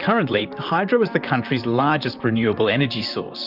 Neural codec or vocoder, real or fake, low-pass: vocoder, 44.1 kHz, 128 mel bands, Pupu-Vocoder; fake; 5.4 kHz